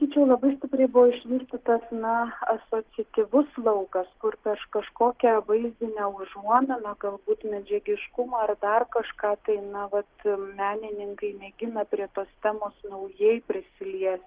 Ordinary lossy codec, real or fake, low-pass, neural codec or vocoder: Opus, 16 kbps; real; 3.6 kHz; none